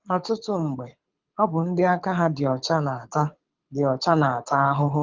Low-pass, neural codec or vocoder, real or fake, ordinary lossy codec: 7.2 kHz; codec, 24 kHz, 6 kbps, HILCodec; fake; Opus, 24 kbps